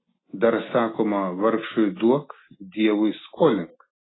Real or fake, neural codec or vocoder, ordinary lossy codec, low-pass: real; none; AAC, 16 kbps; 7.2 kHz